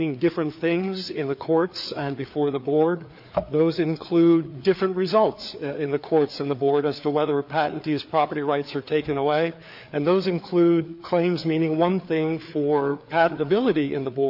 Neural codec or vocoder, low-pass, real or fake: codec, 16 kHz, 4 kbps, FreqCodec, larger model; 5.4 kHz; fake